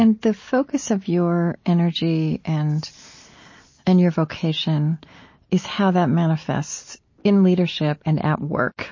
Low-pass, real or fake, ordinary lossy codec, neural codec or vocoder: 7.2 kHz; real; MP3, 32 kbps; none